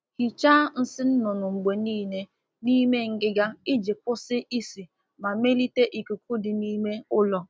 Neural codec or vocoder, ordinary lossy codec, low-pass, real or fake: none; none; none; real